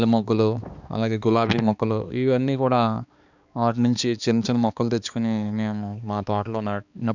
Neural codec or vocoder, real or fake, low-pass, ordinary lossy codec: codec, 16 kHz, 2 kbps, X-Codec, HuBERT features, trained on balanced general audio; fake; 7.2 kHz; none